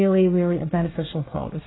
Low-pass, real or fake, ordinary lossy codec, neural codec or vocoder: 7.2 kHz; fake; AAC, 16 kbps; codec, 24 kHz, 1 kbps, SNAC